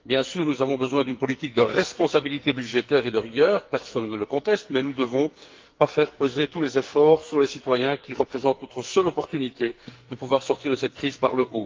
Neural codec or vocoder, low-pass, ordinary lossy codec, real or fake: codec, 44.1 kHz, 2.6 kbps, SNAC; 7.2 kHz; Opus, 32 kbps; fake